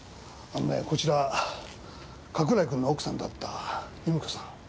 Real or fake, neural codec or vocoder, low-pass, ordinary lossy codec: real; none; none; none